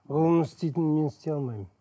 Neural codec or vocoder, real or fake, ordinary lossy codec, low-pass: none; real; none; none